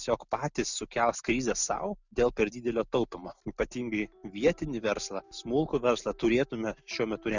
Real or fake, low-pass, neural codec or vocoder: real; 7.2 kHz; none